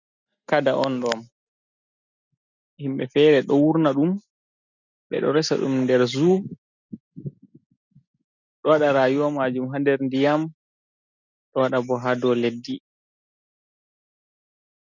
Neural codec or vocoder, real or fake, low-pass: none; real; 7.2 kHz